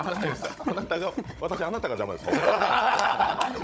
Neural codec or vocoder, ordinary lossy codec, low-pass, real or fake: codec, 16 kHz, 16 kbps, FunCodec, trained on Chinese and English, 50 frames a second; none; none; fake